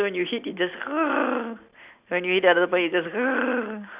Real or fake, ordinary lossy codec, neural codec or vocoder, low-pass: real; Opus, 64 kbps; none; 3.6 kHz